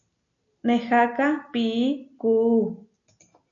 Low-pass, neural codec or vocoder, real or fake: 7.2 kHz; none; real